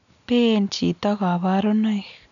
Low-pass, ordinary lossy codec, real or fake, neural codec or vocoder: 7.2 kHz; none; real; none